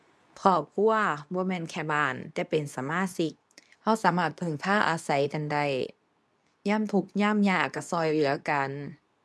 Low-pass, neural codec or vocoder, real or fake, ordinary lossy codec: none; codec, 24 kHz, 0.9 kbps, WavTokenizer, medium speech release version 2; fake; none